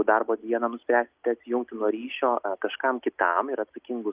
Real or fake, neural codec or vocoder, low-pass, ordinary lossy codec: real; none; 3.6 kHz; Opus, 32 kbps